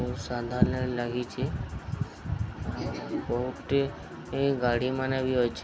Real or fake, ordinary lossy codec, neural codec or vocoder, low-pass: real; none; none; none